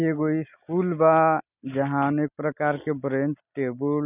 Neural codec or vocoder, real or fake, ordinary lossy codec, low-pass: none; real; none; 3.6 kHz